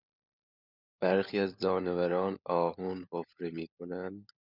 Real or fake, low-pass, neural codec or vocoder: fake; 5.4 kHz; codec, 16 kHz, 16 kbps, FunCodec, trained on LibriTTS, 50 frames a second